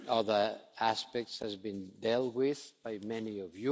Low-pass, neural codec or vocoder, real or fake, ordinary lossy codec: none; none; real; none